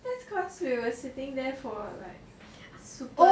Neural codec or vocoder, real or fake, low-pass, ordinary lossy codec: none; real; none; none